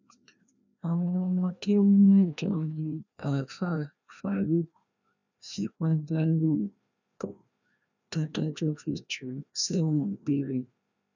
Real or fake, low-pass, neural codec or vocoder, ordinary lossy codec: fake; 7.2 kHz; codec, 16 kHz, 1 kbps, FreqCodec, larger model; none